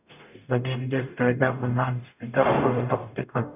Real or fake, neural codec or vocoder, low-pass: fake; codec, 44.1 kHz, 0.9 kbps, DAC; 3.6 kHz